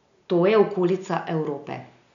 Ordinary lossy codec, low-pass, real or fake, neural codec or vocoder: none; 7.2 kHz; real; none